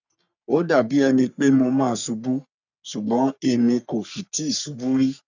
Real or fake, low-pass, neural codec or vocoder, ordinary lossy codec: fake; 7.2 kHz; codec, 44.1 kHz, 3.4 kbps, Pupu-Codec; none